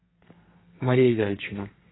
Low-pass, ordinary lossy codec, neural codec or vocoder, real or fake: 7.2 kHz; AAC, 16 kbps; codec, 32 kHz, 1.9 kbps, SNAC; fake